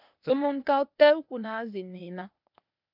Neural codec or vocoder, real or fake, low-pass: codec, 16 kHz, 0.8 kbps, ZipCodec; fake; 5.4 kHz